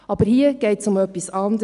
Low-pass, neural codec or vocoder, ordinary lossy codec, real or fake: 10.8 kHz; none; none; real